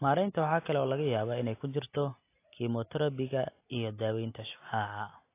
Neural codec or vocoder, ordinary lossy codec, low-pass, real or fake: none; AAC, 24 kbps; 3.6 kHz; real